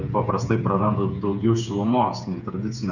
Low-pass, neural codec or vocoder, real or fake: 7.2 kHz; codec, 16 kHz, 8 kbps, FreqCodec, smaller model; fake